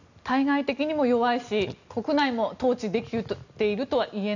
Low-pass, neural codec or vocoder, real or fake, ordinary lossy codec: 7.2 kHz; none; real; none